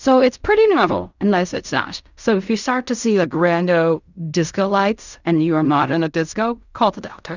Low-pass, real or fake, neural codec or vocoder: 7.2 kHz; fake; codec, 16 kHz in and 24 kHz out, 0.4 kbps, LongCat-Audio-Codec, fine tuned four codebook decoder